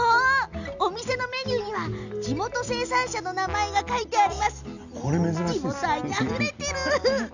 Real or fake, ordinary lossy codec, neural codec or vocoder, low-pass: real; none; none; 7.2 kHz